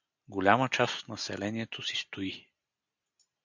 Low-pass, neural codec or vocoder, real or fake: 7.2 kHz; none; real